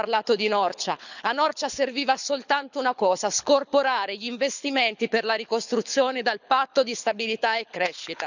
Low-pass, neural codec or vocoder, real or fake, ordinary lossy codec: 7.2 kHz; codec, 24 kHz, 6 kbps, HILCodec; fake; none